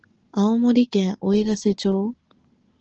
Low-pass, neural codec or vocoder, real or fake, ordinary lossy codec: 7.2 kHz; none; real; Opus, 16 kbps